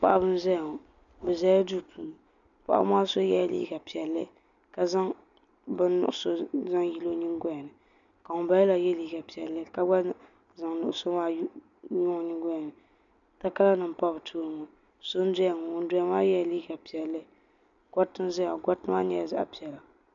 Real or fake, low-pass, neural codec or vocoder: real; 7.2 kHz; none